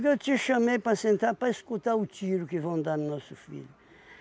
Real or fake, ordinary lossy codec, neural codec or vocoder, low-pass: real; none; none; none